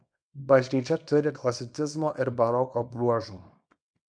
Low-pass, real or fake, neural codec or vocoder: 9.9 kHz; fake; codec, 24 kHz, 0.9 kbps, WavTokenizer, small release